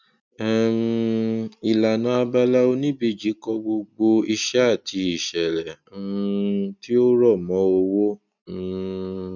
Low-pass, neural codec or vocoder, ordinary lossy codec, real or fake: 7.2 kHz; none; none; real